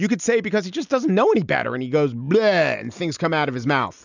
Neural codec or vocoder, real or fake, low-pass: none; real; 7.2 kHz